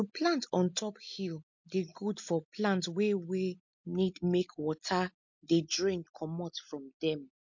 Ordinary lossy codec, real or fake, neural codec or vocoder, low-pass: MP3, 48 kbps; real; none; 7.2 kHz